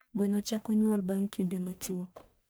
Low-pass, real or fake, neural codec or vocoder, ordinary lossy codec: none; fake; codec, 44.1 kHz, 1.7 kbps, Pupu-Codec; none